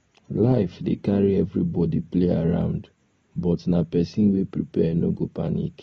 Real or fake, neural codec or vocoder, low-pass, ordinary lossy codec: real; none; 19.8 kHz; AAC, 24 kbps